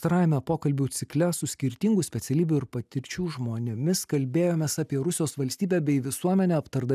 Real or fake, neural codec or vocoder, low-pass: real; none; 14.4 kHz